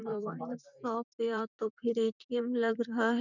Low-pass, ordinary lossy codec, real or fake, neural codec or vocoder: 7.2 kHz; none; fake; vocoder, 44.1 kHz, 128 mel bands, Pupu-Vocoder